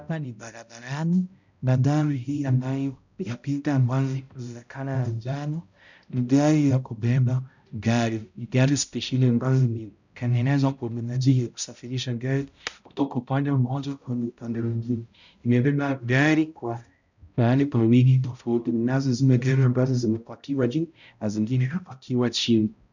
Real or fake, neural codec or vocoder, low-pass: fake; codec, 16 kHz, 0.5 kbps, X-Codec, HuBERT features, trained on balanced general audio; 7.2 kHz